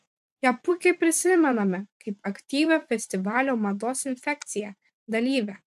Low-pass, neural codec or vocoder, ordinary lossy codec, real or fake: 14.4 kHz; vocoder, 44.1 kHz, 128 mel bands every 512 samples, BigVGAN v2; MP3, 96 kbps; fake